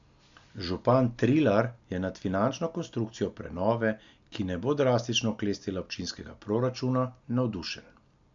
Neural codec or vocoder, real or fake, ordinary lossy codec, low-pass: none; real; MP3, 64 kbps; 7.2 kHz